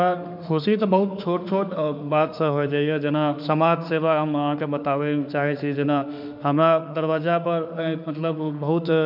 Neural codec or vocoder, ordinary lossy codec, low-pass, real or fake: autoencoder, 48 kHz, 32 numbers a frame, DAC-VAE, trained on Japanese speech; none; 5.4 kHz; fake